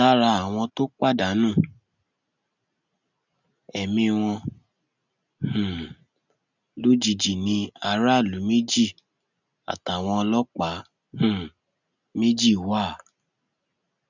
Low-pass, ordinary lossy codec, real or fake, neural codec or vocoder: 7.2 kHz; none; real; none